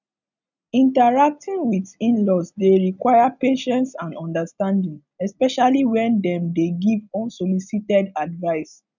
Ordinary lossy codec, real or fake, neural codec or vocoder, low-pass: none; real; none; 7.2 kHz